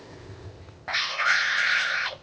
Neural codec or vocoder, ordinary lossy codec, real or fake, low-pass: codec, 16 kHz, 0.8 kbps, ZipCodec; none; fake; none